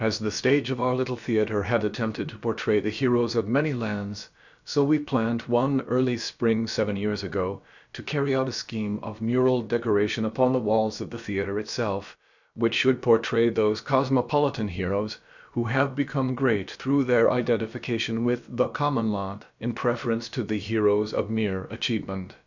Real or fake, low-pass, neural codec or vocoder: fake; 7.2 kHz; codec, 16 kHz, about 1 kbps, DyCAST, with the encoder's durations